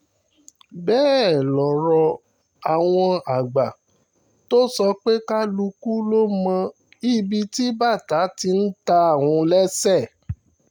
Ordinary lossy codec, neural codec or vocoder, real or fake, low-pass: none; none; real; 19.8 kHz